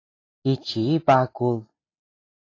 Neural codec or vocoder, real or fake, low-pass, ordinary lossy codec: none; real; 7.2 kHz; AAC, 32 kbps